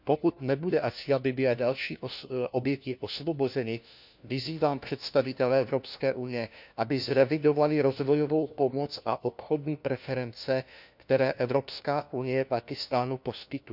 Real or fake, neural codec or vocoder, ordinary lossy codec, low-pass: fake; codec, 16 kHz, 1 kbps, FunCodec, trained on LibriTTS, 50 frames a second; none; 5.4 kHz